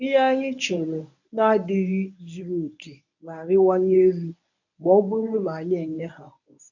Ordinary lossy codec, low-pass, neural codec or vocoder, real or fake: none; 7.2 kHz; codec, 24 kHz, 0.9 kbps, WavTokenizer, medium speech release version 1; fake